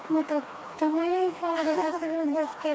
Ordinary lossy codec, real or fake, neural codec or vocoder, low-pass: none; fake; codec, 16 kHz, 2 kbps, FreqCodec, smaller model; none